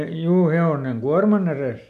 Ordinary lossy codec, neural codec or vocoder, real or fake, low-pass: none; none; real; 14.4 kHz